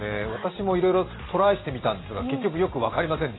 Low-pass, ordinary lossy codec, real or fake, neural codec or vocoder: 7.2 kHz; AAC, 16 kbps; real; none